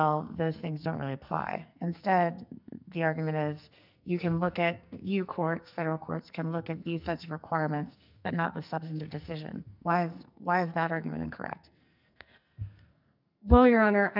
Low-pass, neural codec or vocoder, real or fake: 5.4 kHz; codec, 44.1 kHz, 2.6 kbps, SNAC; fake